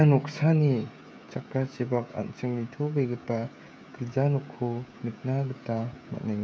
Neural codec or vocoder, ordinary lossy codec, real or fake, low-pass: codec, 16 kHz, 16 kbps, FreqCodec, smaller model; none; fake; none